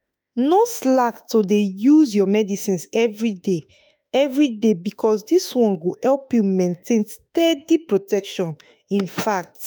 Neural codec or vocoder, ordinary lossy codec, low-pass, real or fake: autoencoder, 48 kHz, 32 numbers a frame, DAC-VAE, trained on Japanese speech; none; none; fake